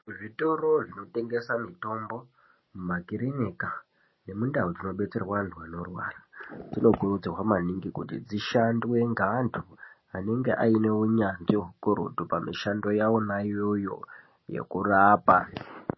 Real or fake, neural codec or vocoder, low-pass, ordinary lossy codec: real; none; 7.2 kHz; MP3, 24 kbps